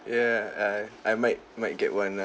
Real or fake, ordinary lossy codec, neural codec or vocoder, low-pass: real; none; none; none